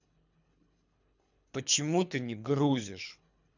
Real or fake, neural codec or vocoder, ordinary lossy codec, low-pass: fake; codec, 24 kHz, 3 kbps, HILCodec; none; 7.2 kHz